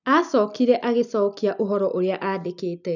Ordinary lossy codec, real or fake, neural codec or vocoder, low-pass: none; real; none; 7.2 kHz